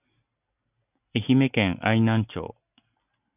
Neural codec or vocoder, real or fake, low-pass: none; real; 3.6 kHz